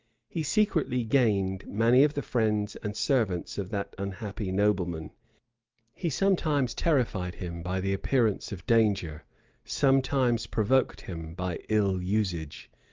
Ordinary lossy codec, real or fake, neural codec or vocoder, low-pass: Opus, 32 kbps; real; none; 7.2 kHz